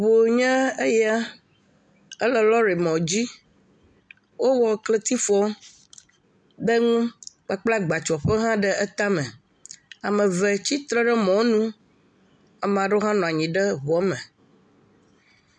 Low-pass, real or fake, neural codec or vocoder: 9.9 kHz; real; none